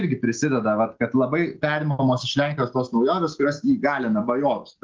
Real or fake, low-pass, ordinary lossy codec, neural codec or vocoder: real; 7.2 kHz; Opus, 16 kbps; none